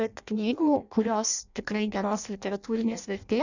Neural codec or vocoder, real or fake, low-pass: codec, 16 kHz in and 24 kHz out, 0.6 kbps, FireRedTTS-2 codec; fake; 7.2 kHz